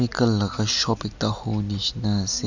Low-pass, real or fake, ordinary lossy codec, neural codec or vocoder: 7.2 kHz; real; none; none